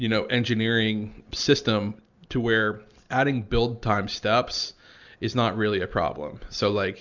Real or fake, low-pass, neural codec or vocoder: real; 7.2 kHz; none